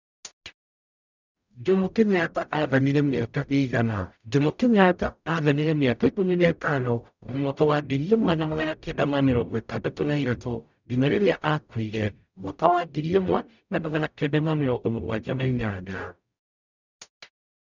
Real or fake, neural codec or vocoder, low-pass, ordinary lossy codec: fake; codec, 44.1 kHz, 0.9 kbps, DAC; 7.2 kHz; none